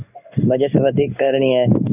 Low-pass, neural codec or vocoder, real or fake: 3.6 kHz; codec, 16 kHz in and 24 kHz out, 1 kbps, XY-Tokenizer; fake